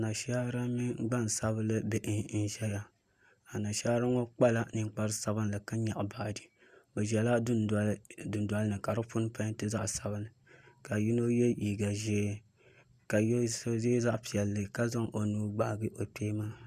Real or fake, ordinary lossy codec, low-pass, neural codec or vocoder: real; Opus, 64 kbps; 14.4 kHz; none